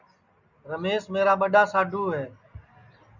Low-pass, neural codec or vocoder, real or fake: 7.2 kHz; none; real